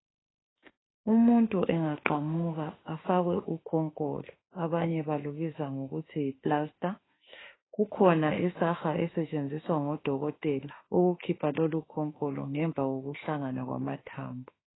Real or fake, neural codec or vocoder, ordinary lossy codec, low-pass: fake; autoencoder, 48 kHz, 32 numbers a frame, DAC-VAE, trained on Japanese speech; AAC, 16 kbps; 7.2 kHz